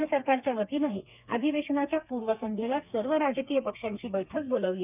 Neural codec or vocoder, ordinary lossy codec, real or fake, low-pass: codec, 32 kHz, 1.9 kbps, SNAC; none; fake; 3.6 kHz